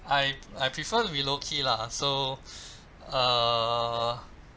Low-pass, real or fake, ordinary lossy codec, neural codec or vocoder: none; real; none; none